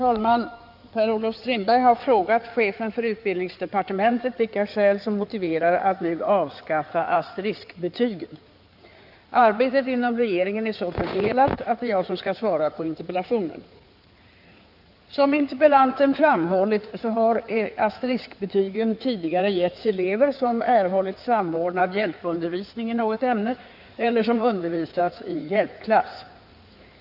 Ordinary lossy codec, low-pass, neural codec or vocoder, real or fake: none; 5.4 kHz; codec, 16 kHz in and 24 kHz out, 2.2 kbps, FireRedTTS-2 codec; fake